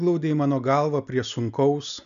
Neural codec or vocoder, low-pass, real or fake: none; 7.2 kHz; real